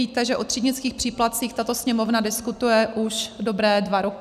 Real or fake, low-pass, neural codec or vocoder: real; 14.4 kHz; none